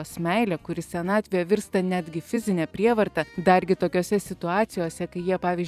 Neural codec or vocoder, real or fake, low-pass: vocoder, 44.1 kHz, 128 mel bands every 256 samples, BigVGAN v2; fake; 14.4 kHz